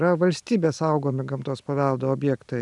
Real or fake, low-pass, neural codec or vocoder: real; 10.8 kHz; none